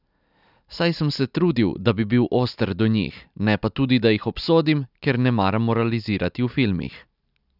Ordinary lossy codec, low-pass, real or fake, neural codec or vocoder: none; 5.4 kHz; real; none